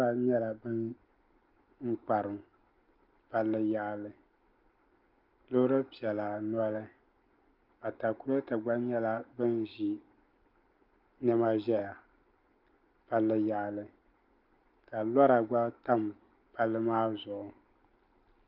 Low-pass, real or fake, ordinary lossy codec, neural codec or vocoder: 5.4 kHz; real; Opus, 32 kbps; none